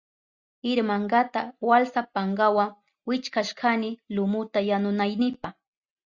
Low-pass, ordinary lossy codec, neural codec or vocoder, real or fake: 7.2 kHz; Opus, 64 kbps; none; real